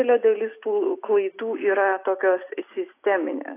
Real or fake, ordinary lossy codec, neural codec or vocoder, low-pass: real; AAC, 24 kbps; none; 3.6 kHz